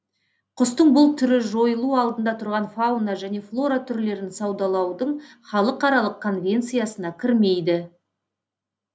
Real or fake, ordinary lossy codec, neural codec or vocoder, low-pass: real; none; none; none